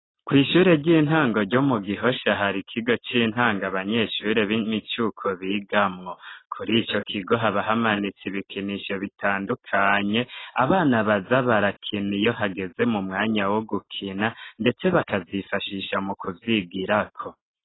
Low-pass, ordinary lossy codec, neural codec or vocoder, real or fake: 7.2 kHz; AAC, 16 kbps; none; real